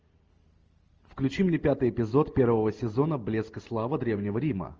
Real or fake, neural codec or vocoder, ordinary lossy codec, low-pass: real; none; Opus, 24 kbps; 7.2 kHz